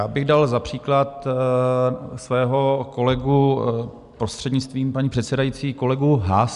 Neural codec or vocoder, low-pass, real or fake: none; 10.8 kHz; real